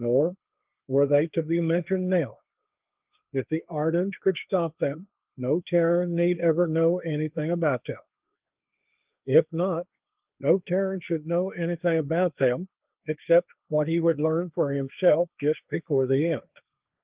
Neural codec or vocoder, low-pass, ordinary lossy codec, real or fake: codec, 16 kHz, 1.1 kbps, Voila-Tokenizer; 3.6 kHz; Opus, 24 kbps; fake